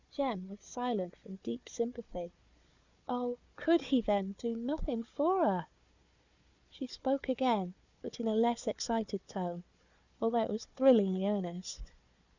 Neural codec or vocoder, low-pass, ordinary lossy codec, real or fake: codec, 16 kHz, 4 kbps, FunCodec, trained on Chinese and English, 50 frames a second; 7.2 kHz; Opus, 64 kbps; fake